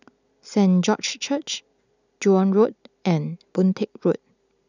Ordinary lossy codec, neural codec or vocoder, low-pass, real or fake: none; none; 7.2 kHz; real